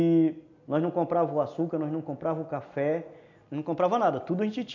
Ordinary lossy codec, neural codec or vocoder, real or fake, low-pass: none; none; real; 7.2 kHz